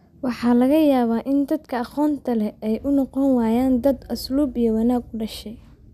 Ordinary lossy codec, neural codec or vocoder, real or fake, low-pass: none; none; real; 14.4 kHz